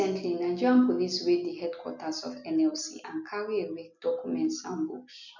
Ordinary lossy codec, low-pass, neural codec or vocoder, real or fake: none; 7.2 kHz; none; real